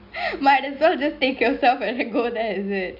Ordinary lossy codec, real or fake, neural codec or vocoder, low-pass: none; real; none; 5.4 kHz